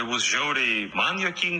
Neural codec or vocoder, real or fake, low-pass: none; real; 9.9 kHz